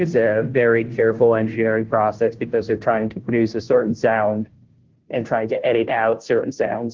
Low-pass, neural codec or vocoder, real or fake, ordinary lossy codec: 7.2 kHz; codec, 16 kHz, 0.5 kbps, FunCodec, trained on Chinese and English, 25 frames a second; fake; Opus, 16 kbps